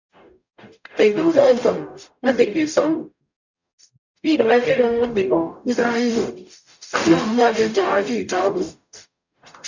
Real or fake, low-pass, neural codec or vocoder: fake; 7.2 kHz; codec, 44.1 kHz, 0.9 kbps, DAC